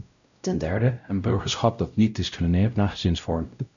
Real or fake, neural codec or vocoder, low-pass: fake; codec, 16 kHz, 0.5 kbps, X-Codec, WavLM features, trained on Multilingual LibriSpeech; 7.2 kHz